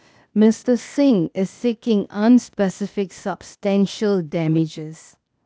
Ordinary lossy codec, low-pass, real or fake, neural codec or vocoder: none; none; fake; codec, 16 kHz, 0.8 kbps, ZipCodec